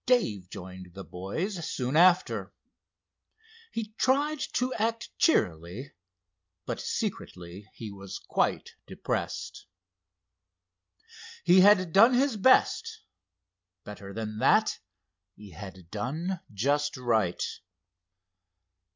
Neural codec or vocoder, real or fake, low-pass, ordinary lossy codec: none; real; 7.2 kHz; MP3, 64 kbps